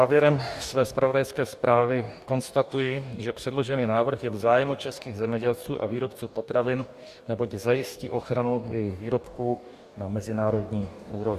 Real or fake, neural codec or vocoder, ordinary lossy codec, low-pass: fake; codec, 44.1 kHz, 2.6 kbps, DAC; Opus, 64 kbps; 14.4 kHz